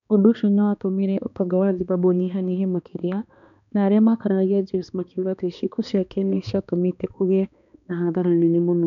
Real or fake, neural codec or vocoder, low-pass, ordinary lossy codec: fake; codec, 16 kHz, 2 kbps, X-Codec, HuBERT features, trained on balanced general audio; 7.2 kHz; none